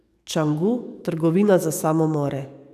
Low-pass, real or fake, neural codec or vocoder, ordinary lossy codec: 14.4 kHz; fake; codec, 44.1 kHz, 7.8 kbps, DAC; none